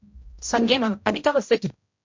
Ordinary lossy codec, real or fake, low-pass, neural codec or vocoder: MP3, 48 kbps; fake; 7.2 kHz; codec, 16 kHz, 0.5 kbps, X-Codec, HuBERT features, trained on general audio